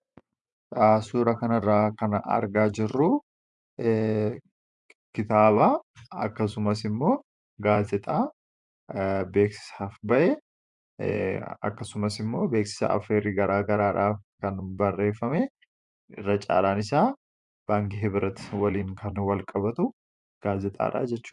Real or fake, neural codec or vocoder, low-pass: fake; vocoder, 24 kHz, 100 mel bands, Vocos; 10.8 kHz